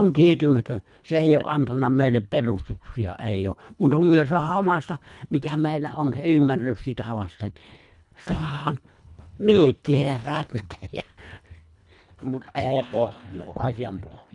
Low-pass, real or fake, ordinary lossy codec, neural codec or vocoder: 10.8 kHz; fake; none; codec, 24 kHz, 1.5 kbps, HILCodec